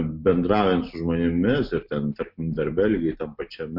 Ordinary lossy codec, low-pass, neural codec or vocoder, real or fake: MP3, 48 kbps; 5.4 kHz; none; real